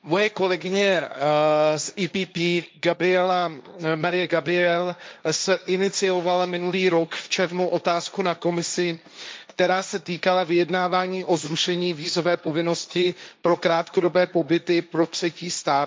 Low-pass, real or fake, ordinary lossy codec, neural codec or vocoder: none; fake; none; codec, 16 kHz, 1.1 kbps, Voila-Tokenizer